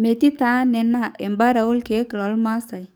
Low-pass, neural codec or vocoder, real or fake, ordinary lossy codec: none; codec, 44.1 kHz, 7.8 kbps, DAC; fake; none